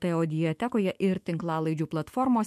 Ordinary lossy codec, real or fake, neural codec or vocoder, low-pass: MP3, 64 kbps; fake; autoencoder, 48 kHz, 128 numbers a frame, DAC-VAE, trained on Japanese speech; 14.4 kHz